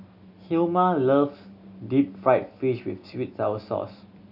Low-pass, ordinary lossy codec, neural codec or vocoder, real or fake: 5.4 kHz; none; none; real